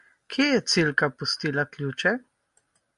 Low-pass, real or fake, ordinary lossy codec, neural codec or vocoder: 10.8 kHz; real; MP3, 64 kbps; none